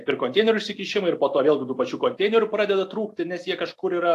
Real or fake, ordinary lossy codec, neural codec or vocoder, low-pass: real; AAC, 64 kbps; none; 14.4 kHz